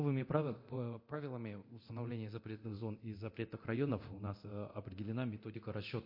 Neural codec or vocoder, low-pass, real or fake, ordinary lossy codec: codec, 24 kHz, 0.9 kbps, DualCodec; 5.4 kHz; fake; MP3, 32 kbps